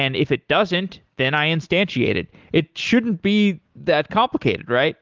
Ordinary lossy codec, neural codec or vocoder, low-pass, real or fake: Opus, 32 kbps; none; 7.2 kHz; real